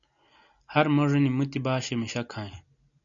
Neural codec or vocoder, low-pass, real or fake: none; 7.2 kHz; real